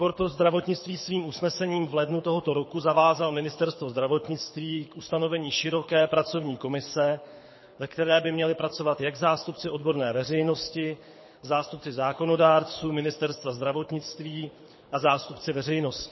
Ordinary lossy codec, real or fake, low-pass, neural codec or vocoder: MP3, 24 kbps; fake; 7.2 kHz; codec, 24 kHz, 6 kbps, HILCodec